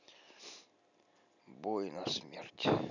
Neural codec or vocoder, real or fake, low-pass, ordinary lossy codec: none; real; 7.2 kHz; none